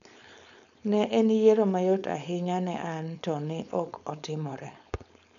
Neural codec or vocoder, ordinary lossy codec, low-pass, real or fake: codec, 16 kHz, 4.8 kbps, FACodec; none; 7.2 kHz; fake